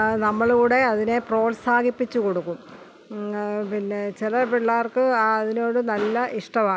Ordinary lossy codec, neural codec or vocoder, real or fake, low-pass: none; none; real; none